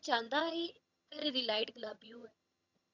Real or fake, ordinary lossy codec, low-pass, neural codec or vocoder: fake; none; 7.2 kHz; vocoder, 22.05 kHz, 80 mel bands, HiFi-GAN